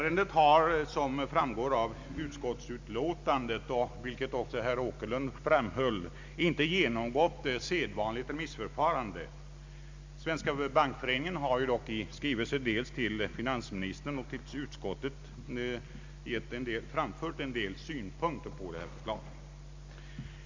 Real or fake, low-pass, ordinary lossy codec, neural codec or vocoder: real; 7.2 kHz; MP3, 64 kbps; none